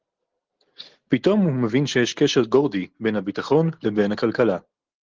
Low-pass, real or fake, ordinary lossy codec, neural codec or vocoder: 7.2 kHz; real; Opus, 16 kbps; none